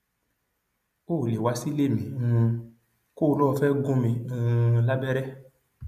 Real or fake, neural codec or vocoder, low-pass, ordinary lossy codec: real; none; 14.4 kHz; none